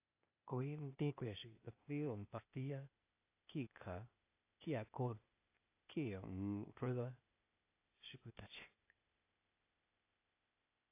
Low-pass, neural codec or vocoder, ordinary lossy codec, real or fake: 3.6 kHz; codec, 16 kHz, 0.8 kbps, ZipCodec; AAC, 32 kbps; fake